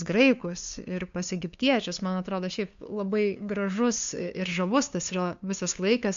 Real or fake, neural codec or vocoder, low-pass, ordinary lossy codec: fake; codec, 16 kHz, 2 kbps, FunCodec, trained on LibriTTS, 25 frames a second; 7.2 kHz; MP3, 64 kbps